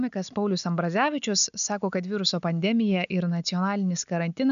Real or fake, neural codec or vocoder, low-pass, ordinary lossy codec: real; none; 7.2 kHz; MP3, 96 kbps